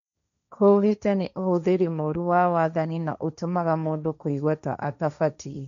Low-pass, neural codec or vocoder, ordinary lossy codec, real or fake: 7.2 kHz; codec, 16 kHz, 1.1 kbps, Voila-Tokenizer; none; fake